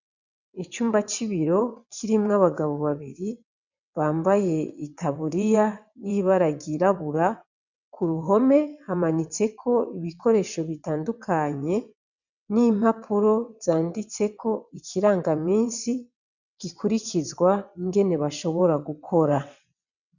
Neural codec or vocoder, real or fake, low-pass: vocoder, 22.05 kHz, 80 mel bands, WaveNeXt; fake; 7.2 kHz